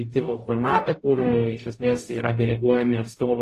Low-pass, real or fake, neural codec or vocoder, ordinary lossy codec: 14.4 kHz; fake; codec, 44.1 kHz, 0.9 kbps, DAC; AAC, 48 kbps